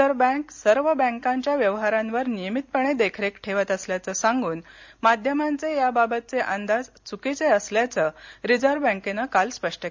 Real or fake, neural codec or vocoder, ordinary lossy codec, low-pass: real; none; MP3, 64 kbps; 7.2 kHz